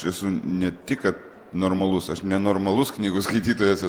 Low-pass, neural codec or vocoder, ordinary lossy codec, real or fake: 19.8 kHz; vocoder, 48 kHz, 128 mel bands, Vocos; Opus, 24 kbps; fake